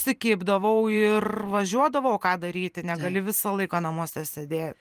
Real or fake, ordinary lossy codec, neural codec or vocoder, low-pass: fake; Opus, 32 kbps; vocoder, 44.1 kHz, 128 mel bands every 512 samples, BigVGAN v2; 14.4 kHz